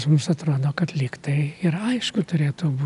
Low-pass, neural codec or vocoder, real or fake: 10.8 kHz; none; real